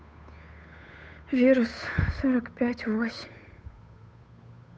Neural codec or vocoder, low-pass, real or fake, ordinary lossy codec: codec, 16 kHz, 8 kbps, FunCodec, trained on Chinese and English, 25 frames a second; none; fake; none